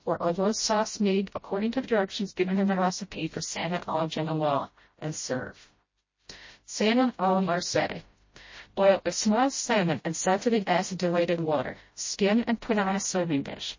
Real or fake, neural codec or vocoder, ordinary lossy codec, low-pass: fake; codec, 16 kHz, 0.5 kbps, FreqCodec, smaller model; MP3, 32 kbps; 7.2 kHz